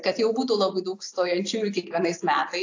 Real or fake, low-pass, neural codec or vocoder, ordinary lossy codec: real; 7.2 kHz; none; AAC, 48 kbps